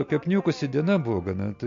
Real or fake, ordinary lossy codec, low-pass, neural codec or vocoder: real; MP3, 48 kbps; 7.2 kHz; none